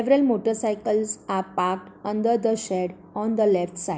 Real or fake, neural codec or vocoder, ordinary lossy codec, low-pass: real; none; none; none